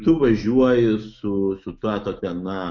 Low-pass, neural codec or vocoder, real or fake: 7.2 kHz; autoencoder, 48 kHz, 128 numbers a frame, DAC-VAE, trained on Japanese speech; fake